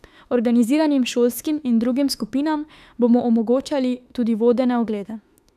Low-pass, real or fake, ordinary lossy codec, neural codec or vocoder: 14.4 kHz; fake; none; autoencoder, 48 kHz, 32 numbers a frame, DAC-VAE, trained on Japanese speech